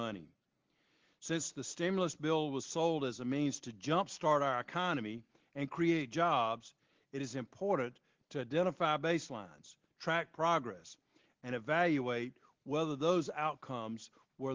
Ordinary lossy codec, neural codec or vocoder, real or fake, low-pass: Opus, 16 kbps; none; real; 7.2 kHz